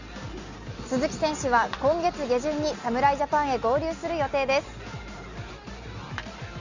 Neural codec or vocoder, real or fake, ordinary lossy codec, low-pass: none; real; Opus, 64 kbps; 7.2 kHz